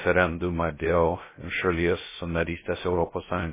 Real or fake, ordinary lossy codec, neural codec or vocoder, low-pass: fake; MP3, 16 kbps; codec, 16 kHz, 0.3 kbps, FocalCodec; 3.6 kHz